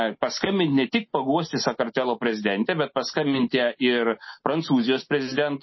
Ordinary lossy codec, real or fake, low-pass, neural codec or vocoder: MP3, 24 kbps; real; 7.2 kHz; none